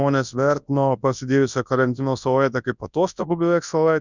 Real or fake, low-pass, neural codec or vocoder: fake; 7.2 kHz; codec, 24 kHz, 0.9 kbps, WavTokenizer, large speech release